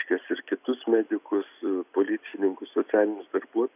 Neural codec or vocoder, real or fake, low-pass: none; real; 3.6 kHz